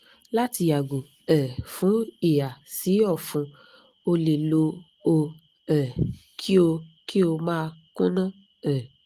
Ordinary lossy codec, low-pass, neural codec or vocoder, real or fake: Opus, 24 kbps; 14.4 kHz; none; real